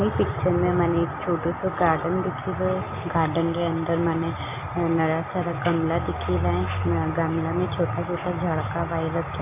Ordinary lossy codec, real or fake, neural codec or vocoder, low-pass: AAC, 24 kbps; real; none; 3.6 kHz